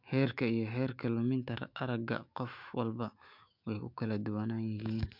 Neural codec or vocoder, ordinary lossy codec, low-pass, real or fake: autoencoder, 48 kHz, 128 numbers a frame, DAC-VAE, trained on Japanese speech; MP3, 48 kbps; 5.4 kHz; fake